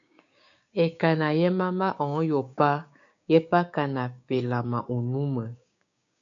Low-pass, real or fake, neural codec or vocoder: 7.2 kHz; fake; codec, 16 kHz, 6 kbps, DAC